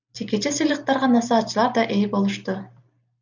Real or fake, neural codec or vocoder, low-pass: real; none; 7.2 kHz